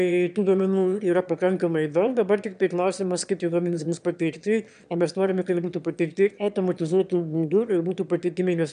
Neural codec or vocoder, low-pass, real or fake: autoencoder, 22.05 kHz, a latent of 192 numbers a frame, VITS, trained on one speaker; 9.9 kHz; fake